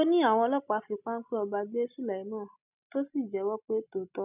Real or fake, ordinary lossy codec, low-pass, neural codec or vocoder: real; none; 3.6 kHz; none